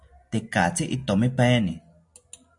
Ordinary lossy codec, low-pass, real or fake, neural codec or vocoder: AAC, 64 kbps; 10.8 kHz; real; none